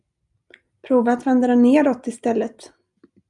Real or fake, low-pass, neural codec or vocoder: real; 10.8 kHz; none